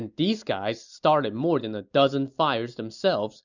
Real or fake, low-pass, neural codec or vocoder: real; 7.2 kHz; none